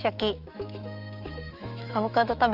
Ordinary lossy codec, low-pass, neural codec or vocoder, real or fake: Opus, 24 kbps; 5.4 kHz; autoencoder, 48 kHz, 128 numbers a frame, DAC-VAE, trained on Japanese speech; fake